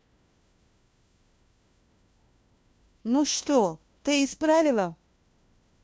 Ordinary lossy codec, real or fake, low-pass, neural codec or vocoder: none; fake; none; codec, 16 kHz, 1 kbps, FunCodec, trained on LibriTTS, 50 frames a second